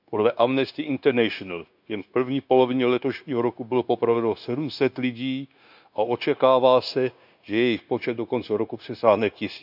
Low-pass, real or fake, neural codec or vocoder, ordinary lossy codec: 5.4 kHz; fake; codec, 16 kHz, 0.9 kbps, LongCat-Audio-Codec; none